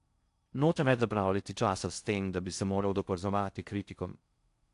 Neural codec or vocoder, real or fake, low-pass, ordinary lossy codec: codec, 16 kHz in and 24 kHz out, 0.6 kbps, FocalCodec, streaming, 4096 codes; fake; 10.8 kHz; AAC, 64 kbps